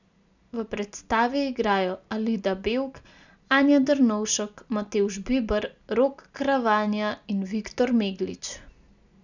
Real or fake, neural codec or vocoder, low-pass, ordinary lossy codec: real; none; 7.2 kHz; none